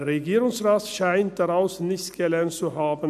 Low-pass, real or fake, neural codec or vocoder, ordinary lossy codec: 14.4 kHz; real; none; none